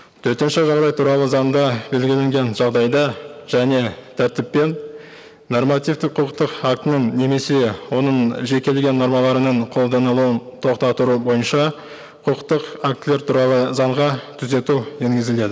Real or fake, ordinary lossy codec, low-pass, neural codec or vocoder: real; none; none; none